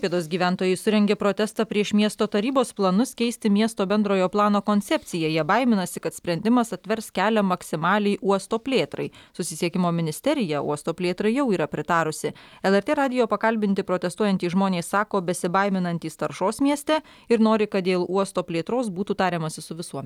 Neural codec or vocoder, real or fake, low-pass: none; real; 19.8 kHz